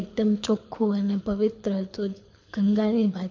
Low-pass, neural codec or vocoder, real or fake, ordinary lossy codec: 7.2 kHz; codec, 24 kHz, 6 kbps, HILCodec; fake; MP3, 48 kbps